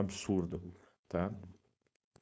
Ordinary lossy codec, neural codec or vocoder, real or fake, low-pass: none; codec, 16 kHz, 4.8 kbps, FACodec; fake; none